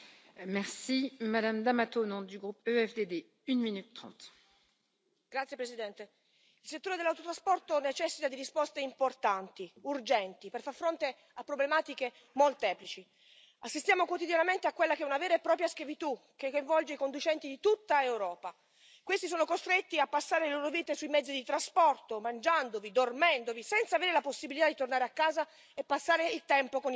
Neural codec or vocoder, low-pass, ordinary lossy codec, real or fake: none; none; none; real